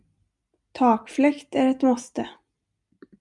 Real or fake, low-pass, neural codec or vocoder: real; 10.8 kHz; none